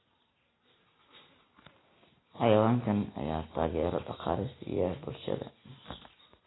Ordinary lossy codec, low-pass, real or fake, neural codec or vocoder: AAC, 16 kbps; 7.2 kHz; real; none